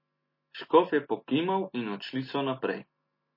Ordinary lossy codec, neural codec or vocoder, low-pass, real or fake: MP3, 24 kbps; none; 5.4 kHz; real